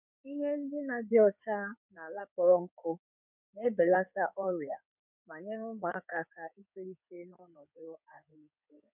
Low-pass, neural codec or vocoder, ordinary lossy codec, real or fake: 3.6 kHz; codec, 16 kHz in and 24 kHz out, 2.2 kbps, FireRedTTS-2 codec; none; fake